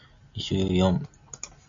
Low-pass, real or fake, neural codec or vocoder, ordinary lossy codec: 7.2 kHz; real; none; Opus, 64 kbps